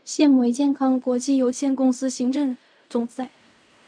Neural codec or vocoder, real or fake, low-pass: codec, 16 kHz in and 24 kHz out, 0.4 kbps, LongCat-Audio-Codec, fine tuned four codebook decoder; fake; 9.9 kHz